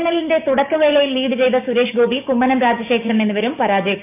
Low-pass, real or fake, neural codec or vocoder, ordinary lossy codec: 3.6 kHz; fake; codec, 16 kHz, 6 kbps, DAC; none